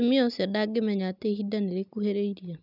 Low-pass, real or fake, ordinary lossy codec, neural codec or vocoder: 5.4 kHz; real; none; none